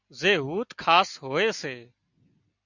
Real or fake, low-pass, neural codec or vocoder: real; 7.2 kHz; none